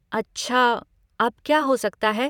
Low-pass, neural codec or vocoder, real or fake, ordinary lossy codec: 19.8 kHz; vocoder, 44.1 kHz, 128 mel bands every 512 samples, BigVGAN v2; fake; none